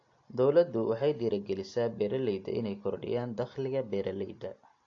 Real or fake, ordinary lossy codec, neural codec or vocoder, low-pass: real; AAC, 48 kbps; none; 7.2 kHz